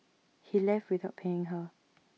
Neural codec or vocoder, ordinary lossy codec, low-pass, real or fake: none; none; none; real